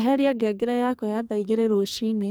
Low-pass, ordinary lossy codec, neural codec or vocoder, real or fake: none; none; codec, 44.1 kHz, 2.6 kbps, SNAC; fake